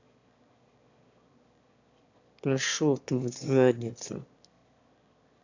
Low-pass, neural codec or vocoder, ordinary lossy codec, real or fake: 7.2 kHz; autoencoder, 22.05 kHz, a latent of 192 numbers a frame, VITS, trained on one speaker; AAC, 48 kbps; fake